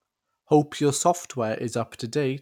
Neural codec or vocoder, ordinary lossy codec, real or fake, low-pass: none; none; real; 19.8 kHz